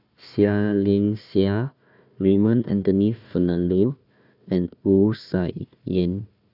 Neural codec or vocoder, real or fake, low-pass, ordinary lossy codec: codec, 16 kHz, 1 kbps, FunCodec, trained on Chinese and English, 50 frames a second; fake; 5.4 kHz; none